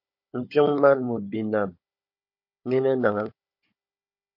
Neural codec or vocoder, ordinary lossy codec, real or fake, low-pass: codec, 16 kHz, 16 kbps, FunCodec, trained on Chinese and English, 50 frames a second; MP3, 32 kbps; fake; 5.4 kHz